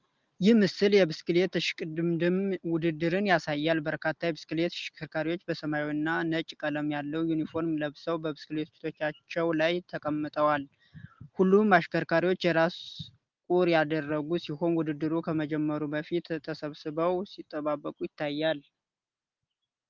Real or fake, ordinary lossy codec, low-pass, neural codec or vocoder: real; Opus, 32 kbps; 7.2 kHz; none